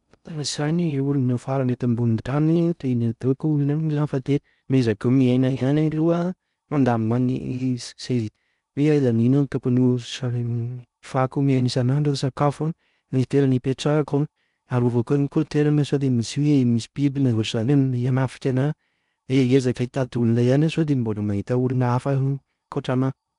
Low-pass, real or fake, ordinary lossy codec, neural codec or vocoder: 10.8 kHz; fake; none; codec, 16 kHz in and 24 kHz out, 0.6 kbps, FocalCodec, streaming, 2048 codes